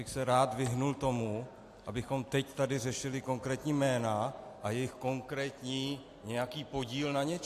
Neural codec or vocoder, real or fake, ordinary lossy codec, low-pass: none; real; AAC, 64 kbps; 14.4 kHz